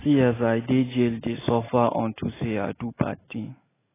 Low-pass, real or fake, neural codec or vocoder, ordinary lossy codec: 3.6 kHz; real; none; AAC, 16 kbps